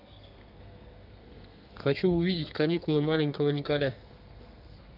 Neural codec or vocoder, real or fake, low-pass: codec, 32 kHz, 1.9 kbps, SNAC; fake; 5.4 kHz